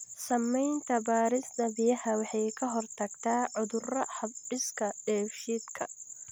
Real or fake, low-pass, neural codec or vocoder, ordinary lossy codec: real; none; none; none